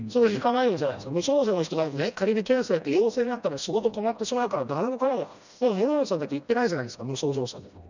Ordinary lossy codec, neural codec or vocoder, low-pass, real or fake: none; codec, 16 kHz, 1 kbps, FreqCodec, smaller model; 7.2 kHz; fake